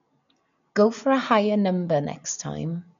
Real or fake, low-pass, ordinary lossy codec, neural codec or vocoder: real; 7.2 kHz; none; none